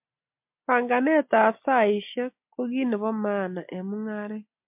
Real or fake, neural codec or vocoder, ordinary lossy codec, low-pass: real; none; MP3, 32 kbps; 3.6 kHz